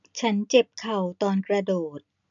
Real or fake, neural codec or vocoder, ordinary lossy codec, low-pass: real; none; none; 7.2 kHz